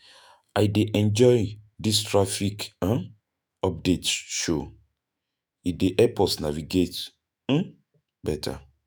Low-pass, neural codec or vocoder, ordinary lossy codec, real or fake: none; autoencoder, 48 kHz, 128 numbers a frame, DAC-VAE, trained on Japanese speech; none; fake